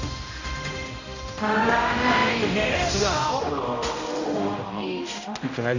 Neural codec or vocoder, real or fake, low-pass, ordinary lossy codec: codec, 16 kHz, 0.5 kbps, X-Codec, HuBERT features, trained on general audio; fake; 7.2 kHz; none